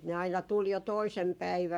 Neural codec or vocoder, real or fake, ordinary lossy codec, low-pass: codec, 44.1 kHz, 7.8 kbps, Pupu-Codec; fake; none; 19.8 kHz